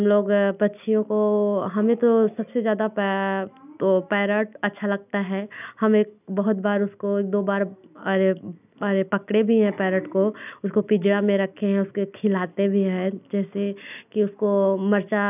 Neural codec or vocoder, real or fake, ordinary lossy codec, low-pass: none; real; none; 3.6 kHz